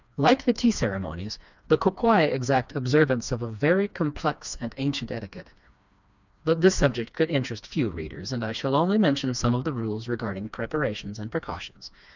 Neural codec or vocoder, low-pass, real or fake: codec, 16 kHz, 2 kbps, FreqCodec, smaller model; 7.2 kHz; fake